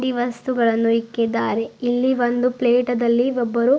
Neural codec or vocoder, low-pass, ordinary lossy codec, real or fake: none; none; none; real